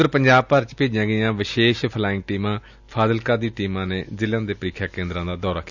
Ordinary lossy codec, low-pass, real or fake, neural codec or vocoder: none; 7.2 kHz; real; none